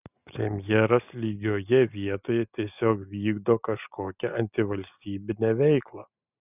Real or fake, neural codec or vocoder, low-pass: real; none; 3.6 kHz